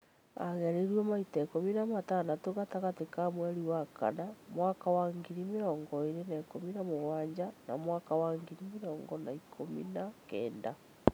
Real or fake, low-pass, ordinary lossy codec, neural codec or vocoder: real; none; none; none